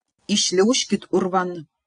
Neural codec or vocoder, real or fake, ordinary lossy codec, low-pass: none; real; AAC, 64 kbps; 9.9 kHz